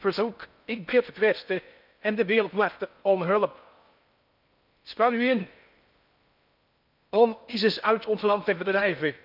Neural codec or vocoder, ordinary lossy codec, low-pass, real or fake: codec, 16 kHz in and 24 kHz out, 0.6 kbps, FocalCodec, streaming, 4096 codes; none; 5.4 kHz; fake